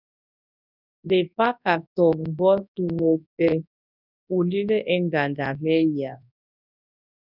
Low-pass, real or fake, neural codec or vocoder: 5.4 kHz; fake; codec, 24 kHz, 0.9 kbps, WavTokenizer, large speech release